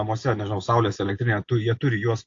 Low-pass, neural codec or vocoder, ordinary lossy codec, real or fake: 7.2 kHz; none; AAC, 48 kbps; real